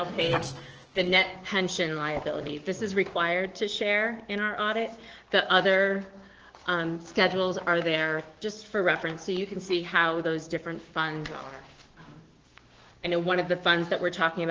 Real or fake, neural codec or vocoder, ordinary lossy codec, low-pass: fake; codec, 16 kHz, 6 kbps, DAC; Opus, 16 kbps; 7.2 kHz